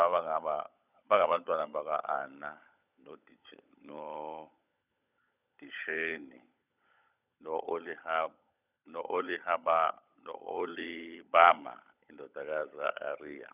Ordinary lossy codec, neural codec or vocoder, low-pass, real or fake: none; codec, 16 kHz, 16 kbps, FunCodec, trained on LibriTTS, 50 frames a second; 3.6 kHz; fake